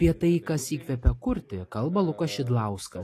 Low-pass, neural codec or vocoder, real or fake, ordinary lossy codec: 14.4 kHz; none; real; AAC, 48 kbps